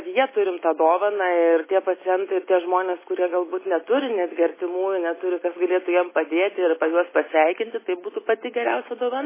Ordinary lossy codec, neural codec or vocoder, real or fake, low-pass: MP3, 16 kbps; none; real; 3.6 kHz